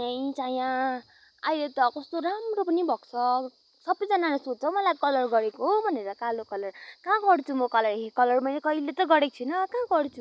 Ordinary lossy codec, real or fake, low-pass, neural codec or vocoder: none; real; none; none